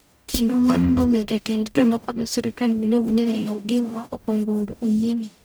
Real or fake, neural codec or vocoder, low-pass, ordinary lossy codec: fake; codec, 44.1 kHz, 0.9 kbps, DAC; none; none